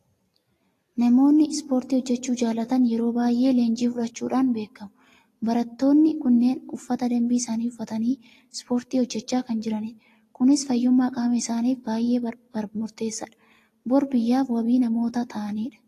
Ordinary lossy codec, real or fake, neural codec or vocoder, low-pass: AAC, 48 kbps; real; none; 14.4 kHz